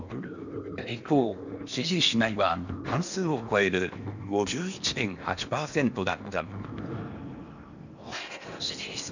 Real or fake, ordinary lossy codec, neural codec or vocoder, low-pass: fake; none; codec, 16 kHz in and 24 kHz out, 0.8 kbps, FocalCodec, streaming, 65536 codes; 7.2 kHz